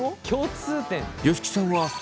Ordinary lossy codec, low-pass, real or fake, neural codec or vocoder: none; none; real; none